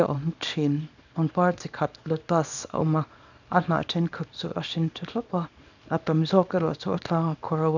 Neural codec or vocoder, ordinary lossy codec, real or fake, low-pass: codec, 24 kHz, 0.9 kbps, WavTokenizer, small release; none; fake; 7.2 kHz